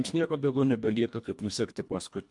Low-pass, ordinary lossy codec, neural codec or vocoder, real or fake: 10.8 kHz; MP3, 64 kbps; codec, 24 kHz, 1.5 kbps, HILCodec; fake